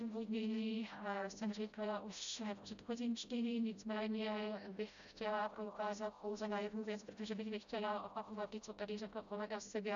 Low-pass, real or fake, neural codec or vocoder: 7.2 kHz; fake; codec, 16 kHz, 0.5 kbps, FreqCodec, smaller model